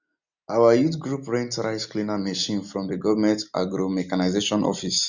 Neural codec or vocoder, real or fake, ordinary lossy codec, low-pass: none; real; none; 7.2 kHz